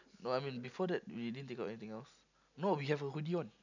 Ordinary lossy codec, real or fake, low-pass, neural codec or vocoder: none; real; 7.2 kHz; none